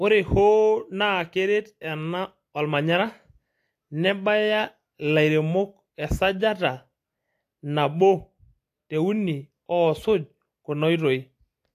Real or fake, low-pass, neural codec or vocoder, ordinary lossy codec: real; 14.4 kHz; none; AAC, 64 kbps